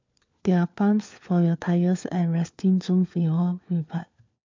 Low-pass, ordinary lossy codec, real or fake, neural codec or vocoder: 7.2 kHz; MP3, 64 kbps; fake; codec, 16 kHz, 4 kbps, FunCodec, trained on LibriTTS, 50 frames a second